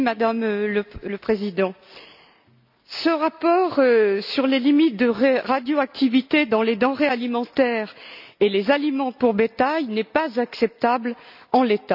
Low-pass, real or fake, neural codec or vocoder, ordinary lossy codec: 5.4 kHz; real; none; none